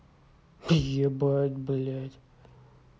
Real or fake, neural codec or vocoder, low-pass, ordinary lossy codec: real; none; none; none